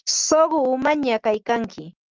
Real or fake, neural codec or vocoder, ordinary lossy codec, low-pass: real; none; Opus, 24 kbps; 7.2 kHz